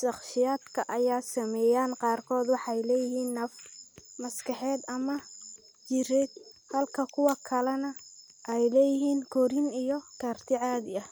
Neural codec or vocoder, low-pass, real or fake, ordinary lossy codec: none; none; real; none